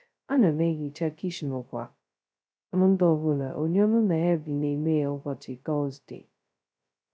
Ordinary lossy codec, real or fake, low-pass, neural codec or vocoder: none; fake; none; codec, 16 kHz, 0.2 kbps, FocalCodec